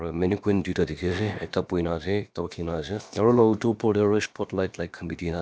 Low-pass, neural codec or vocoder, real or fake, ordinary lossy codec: none; codec, 16 kHz, about 1 kbps, DyCAST, with the encoder's durations; fake; none